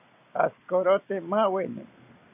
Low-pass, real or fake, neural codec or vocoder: 3.6 kHz; real; none